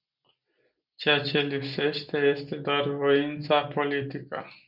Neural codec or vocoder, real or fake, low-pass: none; real; 5.4 kHz